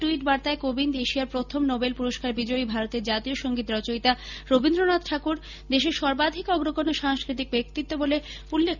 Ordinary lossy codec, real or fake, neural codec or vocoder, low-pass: none; real; none; 7.2 kHz